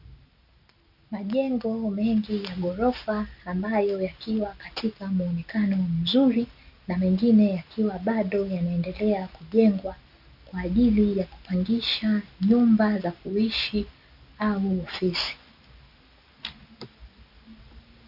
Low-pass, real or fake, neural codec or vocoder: 5.4 kHz; real; none